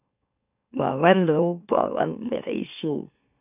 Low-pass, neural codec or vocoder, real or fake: 3.6 kHz; autoencoder, 44.1 kHz, a latent of 192 numbers a frame, MeloTTS; fake